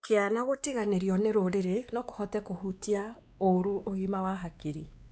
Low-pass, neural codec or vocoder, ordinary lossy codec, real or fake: none; codec, 16 kHz, 2 kbps, X-Codec, WavLM features, trained on Multilingual LibriSpeech; none; fake